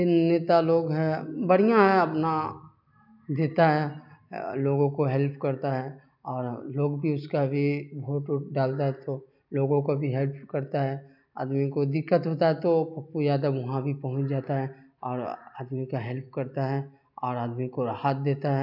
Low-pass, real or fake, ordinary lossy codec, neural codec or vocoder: 5.4 kHz; real; none; none